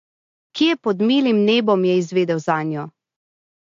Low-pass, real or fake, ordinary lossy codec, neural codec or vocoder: 7.2 kHz; real; AAC, 48 kbps; none